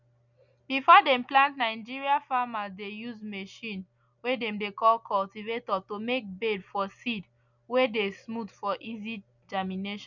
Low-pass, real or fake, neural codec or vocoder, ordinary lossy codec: 7.2 kHz; real; none; none